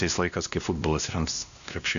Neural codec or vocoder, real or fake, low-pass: codec, 16 kHz, 1 kbps, X-Codec, WavLM features, trained on Multilingual LibriSpeech; fake; 7.2 kHz